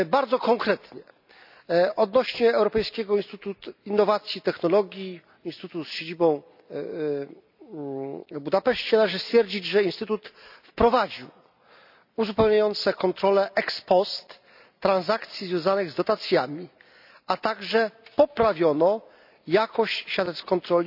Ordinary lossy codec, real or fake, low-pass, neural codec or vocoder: none; real; 5.4 kHz; none